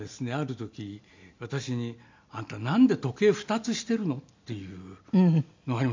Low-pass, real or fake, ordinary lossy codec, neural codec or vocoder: 7.2 kHz; real; MP3, 64 kbps; none